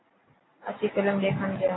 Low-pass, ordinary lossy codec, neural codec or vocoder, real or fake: 7.2 kHz; AAC, 16 kbps; none; real